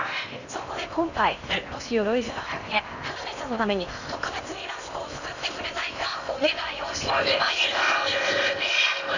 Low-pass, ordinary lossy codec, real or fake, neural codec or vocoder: 7.2 kHz; none; fake; codec, 16 kHz in and 24 kHz out, 0.8 kbps, FocalCodec, streaming, 65536 codes